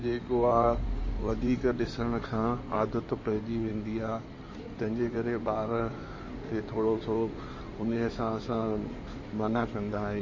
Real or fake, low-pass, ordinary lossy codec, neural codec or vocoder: fake; 7.2 kHz; MP3, 32 kbps; codec, 16 kHz in and 24 kHz out, 2.2 kbps, FireRedTTS-2 codec